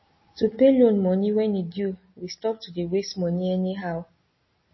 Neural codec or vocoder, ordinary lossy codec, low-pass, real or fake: none; MP3, 24 kbps; 7.2 kHz; real